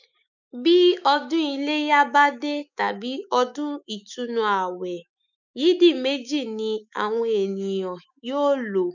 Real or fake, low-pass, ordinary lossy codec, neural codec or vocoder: fake; 7.2 kHz; none; autoencoder, 48 kHz, 128 numbers a frame, DAC-VAE, trained on Japanese speech